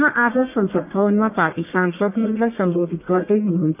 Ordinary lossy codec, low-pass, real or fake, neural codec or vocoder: none; 3.6 kHz; fake; codec, 44.1 kHz, 1.7 kbps, Pupu-Codec